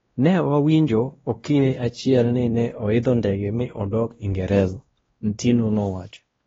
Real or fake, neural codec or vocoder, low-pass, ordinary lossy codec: fake; codec, 16 kHz, 1 kbps, X-Codec, WavLM features, trained on Multilingual LibriSpeech; 7.2 kHz; AAC, 24 kbps